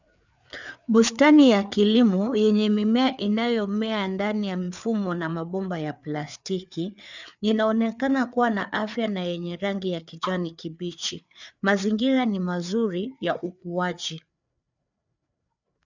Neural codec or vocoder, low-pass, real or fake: codec, 16 kHz, 4 kbps, FreqCodec, larger model; 7.2 kHz; fake